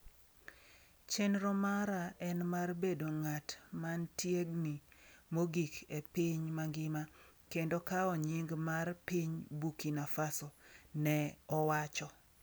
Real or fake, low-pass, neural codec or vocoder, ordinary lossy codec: real; none; none; none